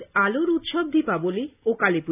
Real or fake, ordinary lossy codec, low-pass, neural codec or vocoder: real; none; 3.6 kHz; none